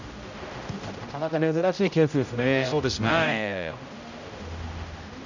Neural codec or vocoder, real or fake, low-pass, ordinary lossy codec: codec, 16 kHz, 0.5 kbps, X-Codec, HuBERT features, trained on general audio; fake; 7.2 kHz; none